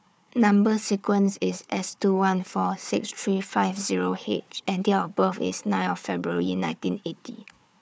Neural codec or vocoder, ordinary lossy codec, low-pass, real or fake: codec, 16 kHz, 4 kbps, FunCodec, trained on Chinese and English, 50 frames a second; none; none; fake